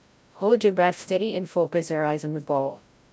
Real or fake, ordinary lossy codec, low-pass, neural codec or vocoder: fake; none; none; codec, 16 kHz, 0.5 kbps, FreqCodec, larger model